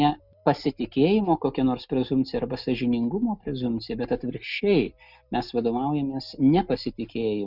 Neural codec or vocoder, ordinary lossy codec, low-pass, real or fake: none; Opus, 64 kbps; 5.4 kHz; real